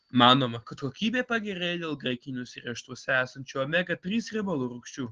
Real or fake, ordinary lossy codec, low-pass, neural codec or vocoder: real; Opus, 16 kbps; 7.2 kHz; none